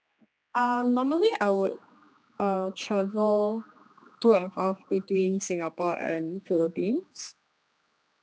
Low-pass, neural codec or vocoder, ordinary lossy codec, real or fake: none; codec, 16 kHz, 2 kbps, X-Codec, HuBERT features, trained on general audio; none; fake